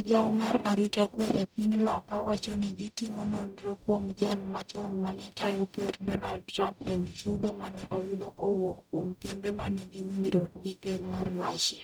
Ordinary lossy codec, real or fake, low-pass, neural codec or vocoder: none; fake; none; codec, 44.1 kHz, 0.9 kbps, DAC